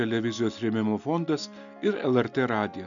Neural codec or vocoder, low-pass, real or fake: none; 7.2 kHz; real